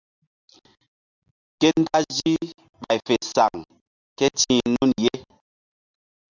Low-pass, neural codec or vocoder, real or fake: 7.2 kHz; none; real